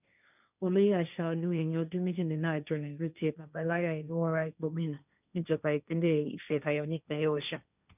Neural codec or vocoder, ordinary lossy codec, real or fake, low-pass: codec, 16 kHz, 1.1 kbps, Voila-Tokenizer; none; fake; 3.6 kHz